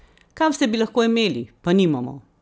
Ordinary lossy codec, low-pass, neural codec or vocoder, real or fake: none; none; none; real